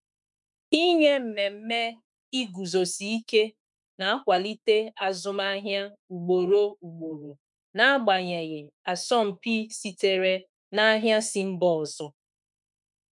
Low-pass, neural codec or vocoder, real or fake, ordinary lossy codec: 10.8 kHz; autoencoder, 48 kHz, 32 numbers a frame, DAC-VAE, trained on Japanese speech; fake; none